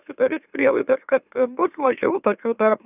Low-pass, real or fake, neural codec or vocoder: 3.6 kHz; fake; autoencoder, 44.1 kHz, a latent of 192 numbers a frame, MeloTTS